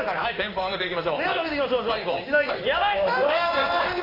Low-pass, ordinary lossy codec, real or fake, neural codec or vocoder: 5.4 kHz; AAC, 24 kbps; fake; codec, 16 kHz in and 24 kHz out, 1 kbps, XY-Tokenizer